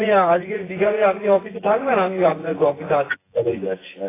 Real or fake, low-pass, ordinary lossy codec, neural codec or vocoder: fake; 3.6 kHz; AAC, 16 kbps; vocoder, 24 kHz, 100 mel bands, Vocos